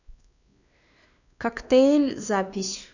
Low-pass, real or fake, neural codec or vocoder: 7.2 kHz; fake; codec, 16 kHz, 2 kbps, X-Codec, WavLM features, trained on Multilingual LibriSpeech